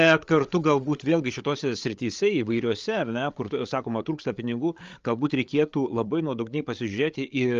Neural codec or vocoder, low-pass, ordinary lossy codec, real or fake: codec, 16 kHz, 8 kbps, FreqCodec, larger model; 7.2 kHz; Opus, 32 kbps; fake